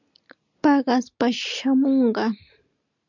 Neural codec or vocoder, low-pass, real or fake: none; 7.2 kHz; real